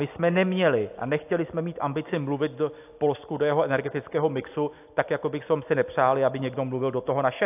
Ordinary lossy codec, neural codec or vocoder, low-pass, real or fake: AAC, 32 kbps; none; 3.6 kHz; real